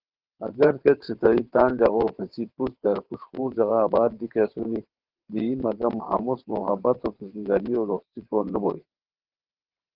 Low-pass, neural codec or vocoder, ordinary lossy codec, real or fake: 5.4 kHz; vocoder, 22.05 kHz, 80 mel bands, WaveNeXt; Opus, 16 kbps; fake